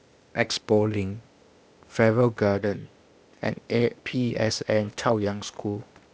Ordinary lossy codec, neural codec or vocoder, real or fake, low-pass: none; codec, 16 kHz, 0.8 kbps, ZipCodec; fake; none